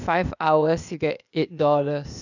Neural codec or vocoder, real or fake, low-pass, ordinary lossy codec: codec, 16 kHz, 0.8 kbps, ZipCodec; fake; 7.2 kHz; none